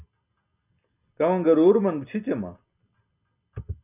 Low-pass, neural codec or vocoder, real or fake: 3.6 kHz; none; real